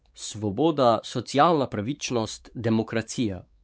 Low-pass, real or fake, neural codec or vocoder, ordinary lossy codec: none; fake; codec, 16 kHz, 4 kbps, X-Codec, WavLM features, trained on Multilingual LibriSpeech; none